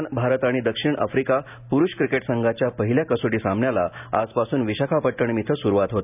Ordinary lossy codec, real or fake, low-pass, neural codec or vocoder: none; real; 3.6 kHz; none